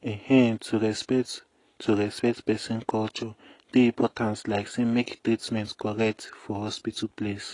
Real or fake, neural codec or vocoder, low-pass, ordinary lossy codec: real; none; 10.8 kHz; AAC, 32 kbps